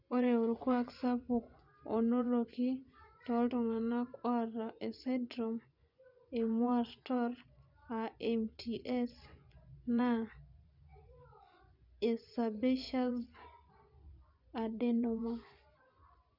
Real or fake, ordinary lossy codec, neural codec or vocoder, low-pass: fake; none; vocoder, 24 kHz, 100 mel bands, Vocos; 5.4 kHz